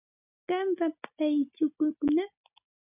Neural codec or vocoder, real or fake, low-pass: none; real; 3.6 kHz